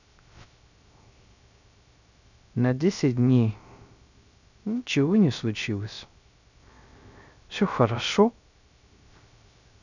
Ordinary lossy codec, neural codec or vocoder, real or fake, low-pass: none; codec, 16 kHz, 0.3 kbps, FocalCodec; fake; 7.2 kHz